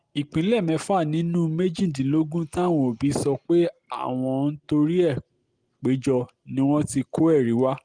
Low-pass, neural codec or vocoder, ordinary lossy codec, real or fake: 9.9 kHz; none; Opus, 24 kbps; real